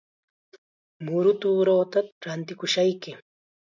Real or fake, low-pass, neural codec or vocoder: real; 7.2 kHz; none